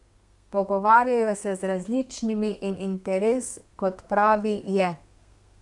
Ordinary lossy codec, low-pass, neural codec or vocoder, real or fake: none; 10.8 kHz; codec, 32 kHz, 1.9 kbps, SNAC; fake